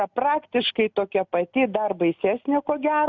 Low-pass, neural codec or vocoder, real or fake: 7.2 kHz; none; real